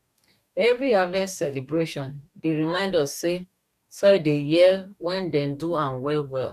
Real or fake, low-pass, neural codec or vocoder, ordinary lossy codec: fake; 14.4 kHz; codec, 44.1 kHz, 2.6 kbps, DAC; none